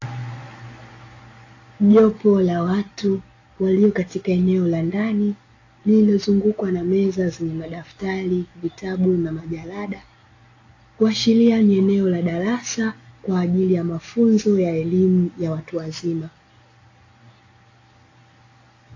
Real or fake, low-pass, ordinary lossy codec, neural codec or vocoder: real; 7.2 kHz; AAC, 32 kbps; none